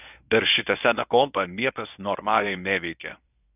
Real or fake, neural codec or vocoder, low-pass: fake; codec, 24 kHz, 0.9 kbps, WavTokenizer, medium speech release version 1; 3.6 kHz